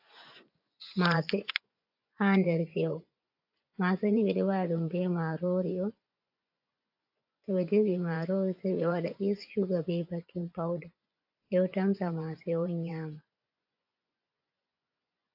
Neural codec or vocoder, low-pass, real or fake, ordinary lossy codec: vocoder, 24 kHz, 100 mel bands, Vocos; 5.4 kHz; fake; AAC, 48 kbps